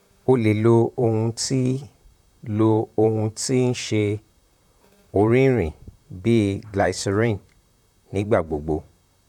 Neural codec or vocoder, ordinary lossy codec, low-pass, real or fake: vocoder, 44.1 kHz, 128 mel bands, Pupu-Vocoder; none; 19.8 kHz; fake